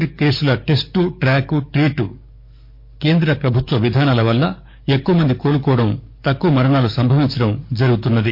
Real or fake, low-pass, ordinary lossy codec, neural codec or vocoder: fake; 5.4 kHz; MP3, 32 kbps; codec, 44.1 kHz, 7.8 kbps, DAC